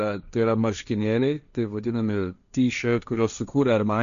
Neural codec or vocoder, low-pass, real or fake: codec, 16 kHz, 1.1 kbps, Voila-Tokenizer; 7.2 kHz; fake